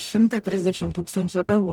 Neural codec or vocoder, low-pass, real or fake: codec, 44.1 kHz, 0.9 kbps, DAC; 19.8 kHz; fake